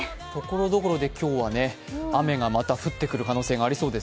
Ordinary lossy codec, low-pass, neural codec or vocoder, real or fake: none; none; none; real